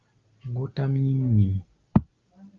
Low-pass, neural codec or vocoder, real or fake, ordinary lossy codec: 7.2 kHz; none; real; Opus, 32 kbps